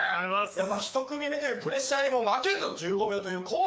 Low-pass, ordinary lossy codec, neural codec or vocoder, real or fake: none; none; codec, 16 kHz, 2 kbps, FreqCodec, larger model; fake